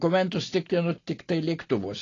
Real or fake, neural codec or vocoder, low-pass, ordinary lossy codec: real; none; 7.2 kHz; AAC, 32 kbps